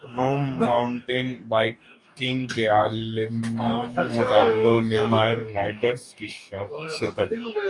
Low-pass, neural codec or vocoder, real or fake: 10.8 kHz; codec, 44.1 kHz, 2.6 kbps, DAC; fake